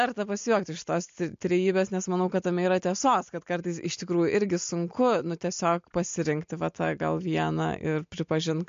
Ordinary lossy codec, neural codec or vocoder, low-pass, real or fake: MP3, 48 kbps; none; 7.2 kHz; real